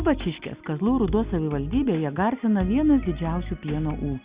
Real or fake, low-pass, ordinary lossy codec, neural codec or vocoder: real; 3.6 kHz; Opus, 64 kbps; none